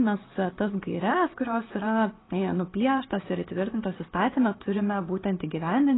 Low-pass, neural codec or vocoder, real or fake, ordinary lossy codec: 7.2 kHz; none; real; AAC, 16 kbps